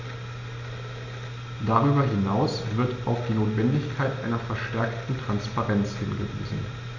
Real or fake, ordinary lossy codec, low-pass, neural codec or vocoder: real; MP3, 48 kbps; 7.2 kHz; none